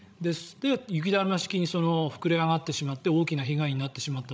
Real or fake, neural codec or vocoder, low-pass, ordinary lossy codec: fake; codec, 16 kHz, 16 kbps, FreqCodec, larger model; none; none